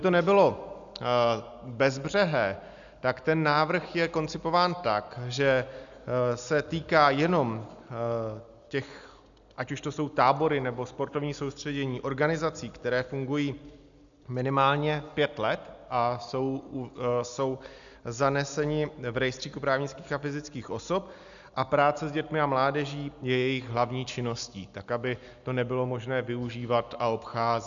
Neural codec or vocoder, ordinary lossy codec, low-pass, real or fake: none; MP3, 96 kbps; 7.2 kHz; real